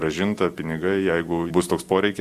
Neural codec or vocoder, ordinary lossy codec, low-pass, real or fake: none; Opus, 64 kbps; 14.4 kHz; real